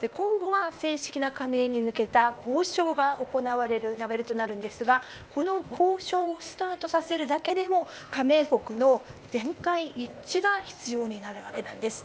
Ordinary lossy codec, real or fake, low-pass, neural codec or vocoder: none; fake; none; codec, 16 kHz, 0.8 kbps, ZipCodec